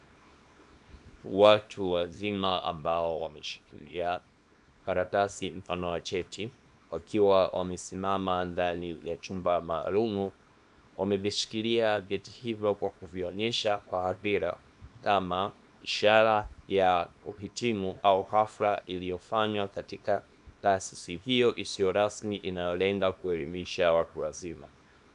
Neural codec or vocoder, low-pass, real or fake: codec, 24 kHz, 0.9 kbps, WavTokenizer, small release; 10.8 kHz; fake